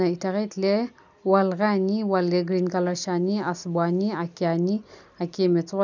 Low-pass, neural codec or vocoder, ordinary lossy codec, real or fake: 7.2 kHz; none; none; real